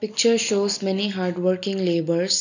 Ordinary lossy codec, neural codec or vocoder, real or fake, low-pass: none; none; real; 7.2 kHz